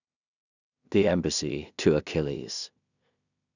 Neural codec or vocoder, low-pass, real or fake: codec, 16 kHz in and 24 kHz out, 0.4 kbps, LongCat-Audio-Codec, two codebook decoder; 7.2 kHz; fake